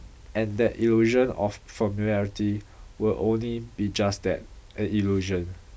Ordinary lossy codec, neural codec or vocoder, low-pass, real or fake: none; none; none; real